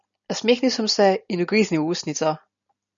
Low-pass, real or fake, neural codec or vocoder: 7.2 kHz; real; none